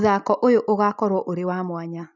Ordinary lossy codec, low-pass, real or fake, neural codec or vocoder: none; 7.2 kHz; real; none